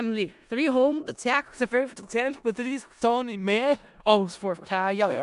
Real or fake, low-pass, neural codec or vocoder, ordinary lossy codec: fake; 10.8 kHz; codec, 16 kHz in and 24 kHz out, 0.4 kbps, LongCat-Audio-Codec, four codebook decoder; AAC, 96 kbps